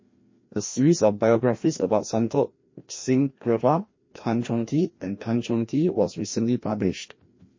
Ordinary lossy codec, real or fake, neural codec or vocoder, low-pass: MP3, 32 kbps; fake; codec, 16 kHz, 1 kbps, FreqCodec, larger model; 7.2 kHz